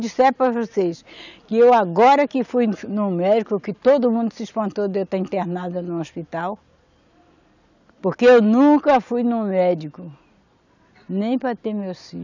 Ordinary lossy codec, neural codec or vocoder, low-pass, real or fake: none; none; 7.2 kHz; real